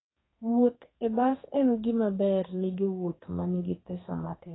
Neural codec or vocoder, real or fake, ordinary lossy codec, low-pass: codec, 32 kHz, 1.9 kbps, SNAC; fake; AAC, 16 kbps; 7.2 kHz